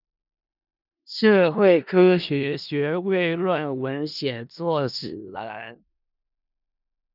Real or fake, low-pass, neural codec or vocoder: fake; 5.4 kHz; codec, 16 kHz in and 24 kHz out, 0.4 kbps, LongCat-Audio-Codec, four codebook decoder